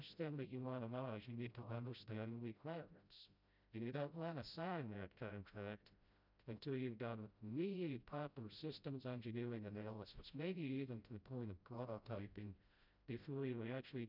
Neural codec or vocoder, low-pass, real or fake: codec, 16 kHz, 0.5 kbps, FreqCodec, smaller model; 5.4 kHz; fake